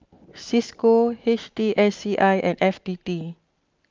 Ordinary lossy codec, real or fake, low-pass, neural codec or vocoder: Opus, 32 kbps; real; 7.2 kHz; none